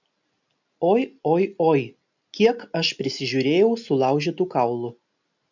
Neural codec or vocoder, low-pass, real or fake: none; 7.2 kHz; real